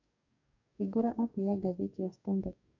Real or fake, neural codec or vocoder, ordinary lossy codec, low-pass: fake; codec, 44.1 kHz, 2.6 kbps, DAC; none; 7.2 kHz